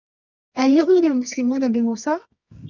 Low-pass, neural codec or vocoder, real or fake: 7.2 kHz; codec, 24 kHz, 0.9 kbps, WavTokenizer, medium music audio release; fake